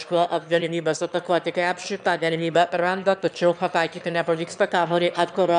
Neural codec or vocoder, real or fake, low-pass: autoencoder, 22.05 kHz, a latent of 192 numbers a frame, VITS, trained on one speaker; fake; 9.9 kHz